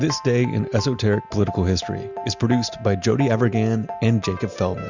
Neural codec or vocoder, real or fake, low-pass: none; real; 7.2 kHz